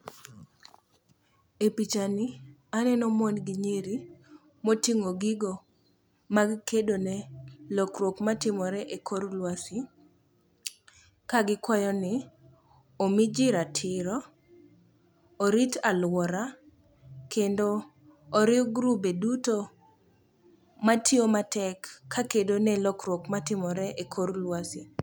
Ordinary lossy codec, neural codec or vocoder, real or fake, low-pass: none; none; real; none